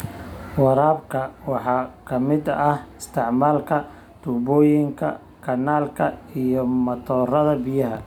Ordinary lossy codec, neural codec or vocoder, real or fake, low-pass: none; none; real; 19.8 kHz